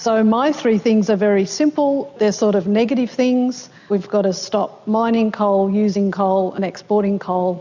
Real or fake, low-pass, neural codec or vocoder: real; 7.2 kHz; none